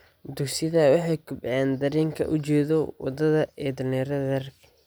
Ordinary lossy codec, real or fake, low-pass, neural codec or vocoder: none; real; none; none